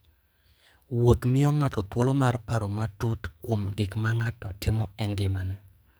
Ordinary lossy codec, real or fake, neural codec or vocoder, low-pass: none; fake; codec, 44.1 kHz, 2.6 kbps, SNAC; none